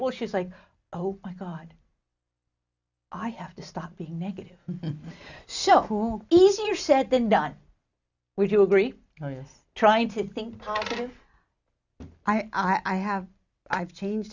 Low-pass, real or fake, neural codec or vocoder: 7.2 kHz; real; none